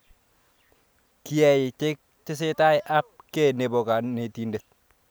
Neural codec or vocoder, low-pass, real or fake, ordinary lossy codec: none; none; real; none